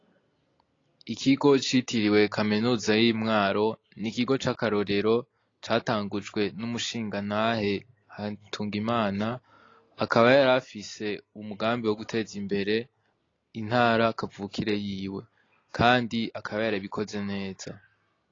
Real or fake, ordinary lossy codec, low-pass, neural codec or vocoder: real; AAC, 32 kbps; 7.2 kHz; none